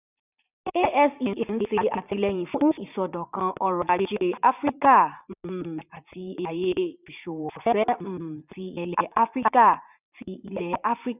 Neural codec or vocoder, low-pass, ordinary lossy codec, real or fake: vocoder, 44.1 kHz, 80 mel bands, Vocos; 3.6 kHz; none; fake